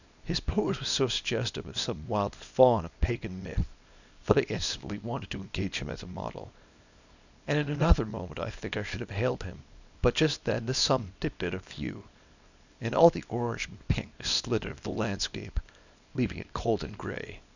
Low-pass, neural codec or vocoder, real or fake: 7.2 kHz; codec, 24 kHz, 0.9 kbps, WavTokenizer, small release; fake